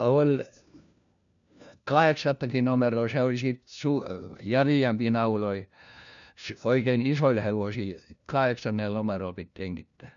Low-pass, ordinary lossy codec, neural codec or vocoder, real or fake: 7.2 kHz; none; codec, 16 kHz, 1 kbps, FunCodec, trained on LibriTTS, 50 frames a second; fake